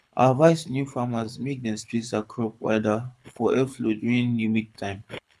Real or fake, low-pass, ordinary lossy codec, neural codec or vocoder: fake; none; none; codec, 24 kHz, 6 kbps, HILCodec